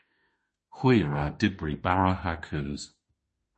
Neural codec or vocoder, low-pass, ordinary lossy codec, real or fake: autoencoder, 48 kHz, 32 numbers a frame, DAC-VAE, trained on Japanese speech; 10.8 kHz; MP3, 32 kbps; fake